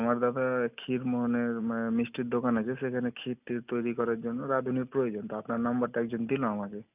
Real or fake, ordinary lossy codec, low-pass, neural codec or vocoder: real; none; 3.6 kHz; none